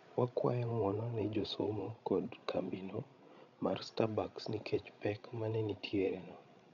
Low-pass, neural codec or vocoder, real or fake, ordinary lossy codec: 7.2 kHz; codec, 16 kHz, 16 kbps, FreqCodec, larger model; fake; none